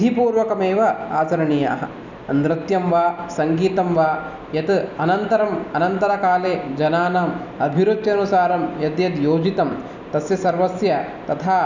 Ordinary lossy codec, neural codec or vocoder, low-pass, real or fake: none; none; 7.2 kHz; real